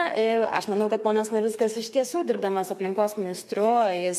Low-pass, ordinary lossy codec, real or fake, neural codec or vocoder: 14.4 kHz; MP3, 64 kbps; fake; codec, 32 kHz, 1.9 kbps, SNAC